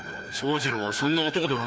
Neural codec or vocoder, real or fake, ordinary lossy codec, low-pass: codec, 16 kHz, 2 kbps, FreqCodec, larger model; fake; none; none